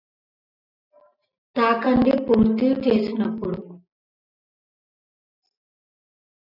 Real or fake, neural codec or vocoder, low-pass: real; none; 5.4 kHz